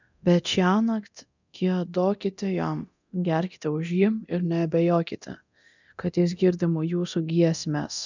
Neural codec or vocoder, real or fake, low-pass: codec, 24 kHz, 0.9 kbps, DualCodec; fake; 7.2 kHz